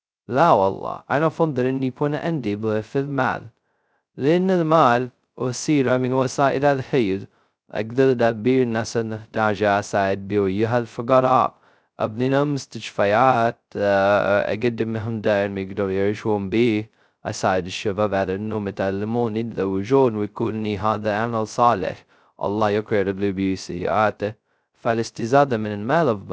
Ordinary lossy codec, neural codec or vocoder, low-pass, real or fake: none; codec, 16 kHz, 0.2 kbps, FocalCodec; none; fake